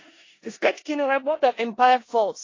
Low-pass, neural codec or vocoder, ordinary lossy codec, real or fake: 7.2 kHz; codec, 16 kHz in and 24 kHz out, 0.4 kbps, LongCat-Audio-Codec, four codebook decoder; AAC, 32 kbps; fake